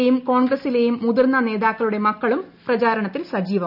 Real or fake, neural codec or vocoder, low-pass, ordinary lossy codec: real; none; 5.4 kHz; none